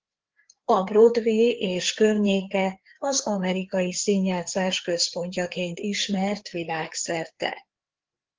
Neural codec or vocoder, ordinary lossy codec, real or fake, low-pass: codec, 16 kHz, 4 kbps, FreqCodec, larger model; Opus, 16 kbps; fake; 7.2 kHz